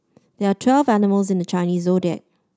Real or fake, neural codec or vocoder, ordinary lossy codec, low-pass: real; none; none; none